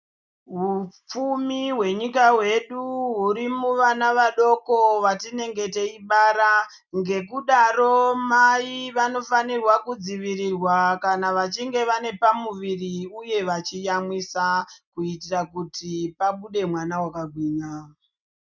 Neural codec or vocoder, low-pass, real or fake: none; 7.2 kHz; real